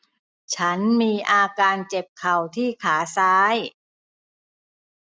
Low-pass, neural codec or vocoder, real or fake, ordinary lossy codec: none; none; real; none